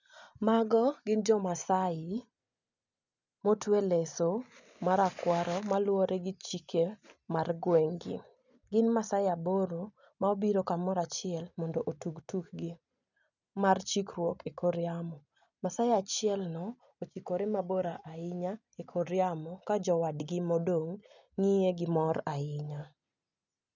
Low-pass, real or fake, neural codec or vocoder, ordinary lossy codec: 7.2 kHz; real; none; none